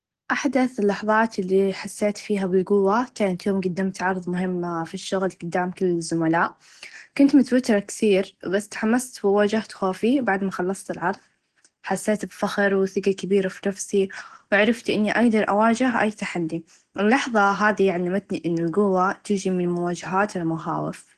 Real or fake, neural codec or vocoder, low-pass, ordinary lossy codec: real; none; 10.8 kHz; Opus, 16 kbps